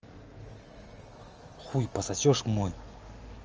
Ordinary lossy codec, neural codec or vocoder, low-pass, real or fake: Opus, 24 kbps; none; 7.2 kHz; real